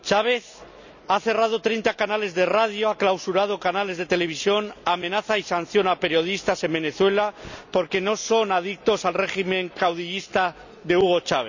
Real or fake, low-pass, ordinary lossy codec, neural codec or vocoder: real; 7.2 kHz; none; none